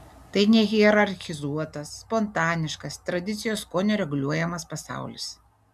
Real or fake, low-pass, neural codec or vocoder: real; 14.4 kHz; none